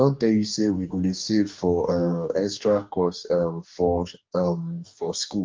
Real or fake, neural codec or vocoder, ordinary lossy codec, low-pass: fake; codec, 44.1 kHz, 2.6 kbps, DAC; Opus, 32 kbps; 7.2 kHz